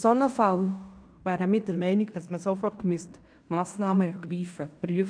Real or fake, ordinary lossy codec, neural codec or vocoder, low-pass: fake; AAC, 64 kbps; codec, 16 kHz in and 24 kHz out, 0.9 kbps, LongCat-Audio-Codec, fine tuned four codebook decoder; 9.9 kHz